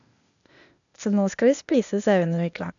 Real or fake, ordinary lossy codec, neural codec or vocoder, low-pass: fake; none; codec, 16 kHz, 0.8 kbps, ZipCodec; 7.2 kHz